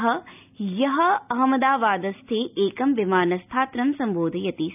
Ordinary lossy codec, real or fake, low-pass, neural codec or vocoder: none; real; 3.6 kHz; none